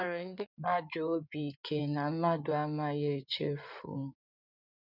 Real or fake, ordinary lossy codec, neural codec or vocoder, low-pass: fake; none; codec, 16 kHz in and 24 kHz out, 2.2 kbps, FireRedTTS-2 codec; 5.4 kHz